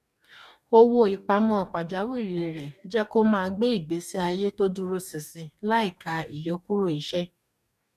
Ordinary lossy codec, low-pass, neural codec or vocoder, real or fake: none; 14.4 kHz; codec, 44.1 kHz, 2.6 kbps, DAC; fake